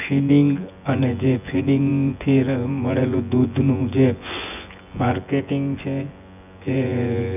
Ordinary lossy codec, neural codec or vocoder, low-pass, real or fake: none; vocoder, 24 kHz, 100 mel bands, Vocos; 3.6 kHz; fake